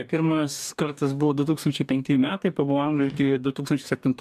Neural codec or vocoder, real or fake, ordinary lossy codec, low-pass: codec, 44.1 kHz, 2.6 kbps, DAC; fake; MP3, 96 kbps; 14.4 kHz